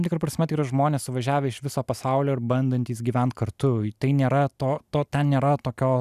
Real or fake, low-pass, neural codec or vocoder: real; 14.4 kHz; none